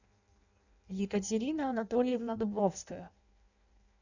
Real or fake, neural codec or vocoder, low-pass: fake; codec, 16 kHz in and 24 kHz out, 0.6 kbps, FireRedTTS-2 codec; 7.2 kHz